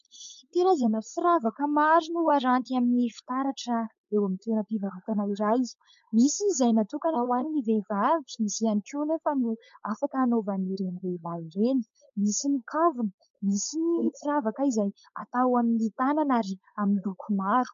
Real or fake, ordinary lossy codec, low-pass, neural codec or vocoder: fake; MP3, 48 kbps; 7.2 kHz; codec, 16 kHz, 4.8 kbps, FACodec